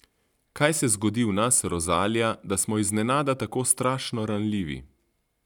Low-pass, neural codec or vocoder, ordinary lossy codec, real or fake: 19.8 kHz; none; none; real